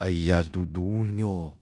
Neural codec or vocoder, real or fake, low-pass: codec, 16 kHz in and 24 kHz out, 0.9 kbps, LongCat-Audio-Codec, four codebook decoder; fake; 10.8 kHz